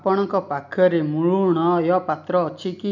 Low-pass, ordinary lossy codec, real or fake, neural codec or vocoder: 7.2 kHz; none; real; none